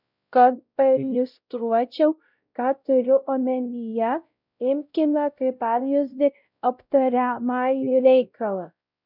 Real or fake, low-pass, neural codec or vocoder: fake; 5.4 kHz; codec, 16 kHz, 0.5 kbps, X-Codec, WavLM features, trained on Multilingual LibriSpeech